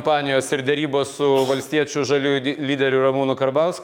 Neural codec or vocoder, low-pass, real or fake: codec, 44.1 kHz, 7.8 kbps, DAC; 19.8 kHz; fake